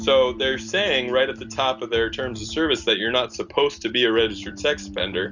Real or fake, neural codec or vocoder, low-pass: real; none; 7.2 kHz